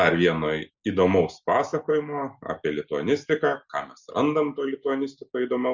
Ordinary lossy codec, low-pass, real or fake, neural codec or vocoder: Opus, 64 kbps; 7.2 kHz; real; none